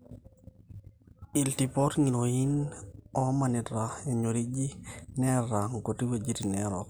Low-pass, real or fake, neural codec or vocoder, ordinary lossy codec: none; real; none; none